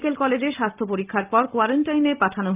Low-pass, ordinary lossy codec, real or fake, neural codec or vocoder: 3.6 kHz; Opus, 32 kbps; real; none